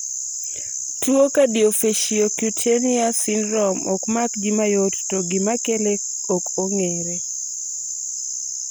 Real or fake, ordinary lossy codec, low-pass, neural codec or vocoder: real; none; none; none